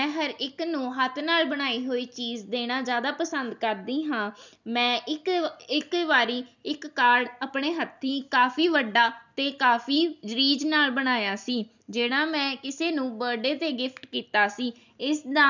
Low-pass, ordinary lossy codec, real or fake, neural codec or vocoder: 7.2 kHz; none; real; none